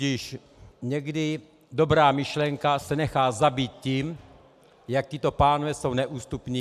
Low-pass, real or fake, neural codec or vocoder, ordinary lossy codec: 14.4 kHz; real; none; AAC, 96 kbps